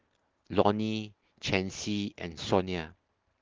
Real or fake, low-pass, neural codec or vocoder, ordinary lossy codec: real; 7.2 kHz; none; Opus, 16 kbps